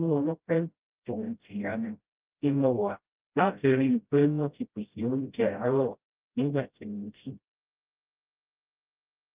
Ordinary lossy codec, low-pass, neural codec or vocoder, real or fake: Opus, 24 kbps; 3.6 kHz; codec, 16 kHz, 0.5 kbps, FreqCodec, smaller model; fake